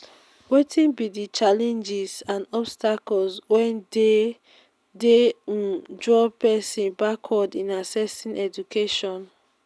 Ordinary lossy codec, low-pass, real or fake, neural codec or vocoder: none; none; real; none